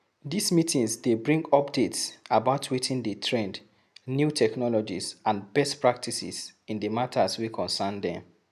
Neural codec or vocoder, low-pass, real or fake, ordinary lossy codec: none; 14.4 kHz; real; none